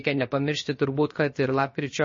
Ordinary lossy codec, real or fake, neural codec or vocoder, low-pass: MP3, 32 kbps; fake; codec, 16 kHz, 0.7 kbps, FocalCodec; 7.2 kHz